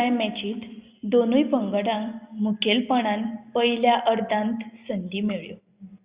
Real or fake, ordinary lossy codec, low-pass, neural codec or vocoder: real; Opus, 64 kbps; 3.6 kHz; none